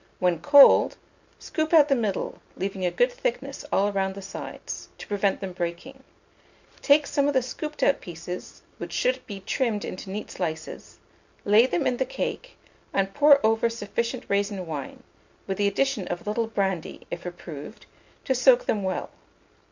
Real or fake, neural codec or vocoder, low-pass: real; none; 7.2 kHz